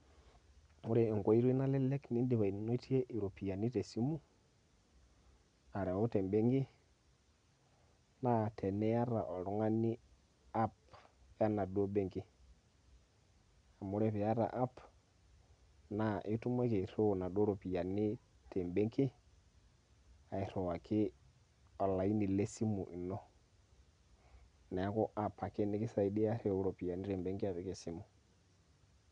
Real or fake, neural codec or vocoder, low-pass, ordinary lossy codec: real; none; 9.9 kHz; none